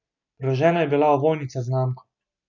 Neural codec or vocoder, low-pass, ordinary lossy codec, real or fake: none; 7.2 kHz; none; real